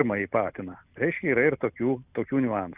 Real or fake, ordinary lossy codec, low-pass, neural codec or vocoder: real; Opus, 64 kbps; 3.6 kHz; none